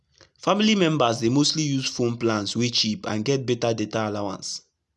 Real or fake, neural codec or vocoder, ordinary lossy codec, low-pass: real; none; none; none